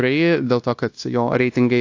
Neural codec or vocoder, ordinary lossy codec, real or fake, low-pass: codec, 16 kHz, 0.9 kbps, LongCat-Audio-Codec; AAC, 48 kbps; fake; 7.2 kHz